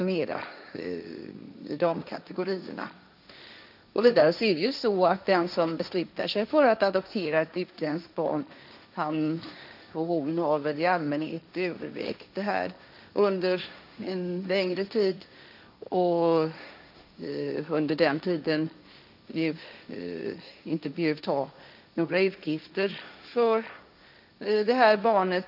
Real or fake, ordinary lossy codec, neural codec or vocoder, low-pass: fake; none; codec, 16 kHz, 1.1 kbps, Voila-Tokenizer; 5.4 kHz